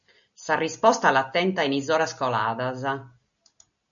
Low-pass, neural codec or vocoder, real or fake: 7.2 kHz; none; real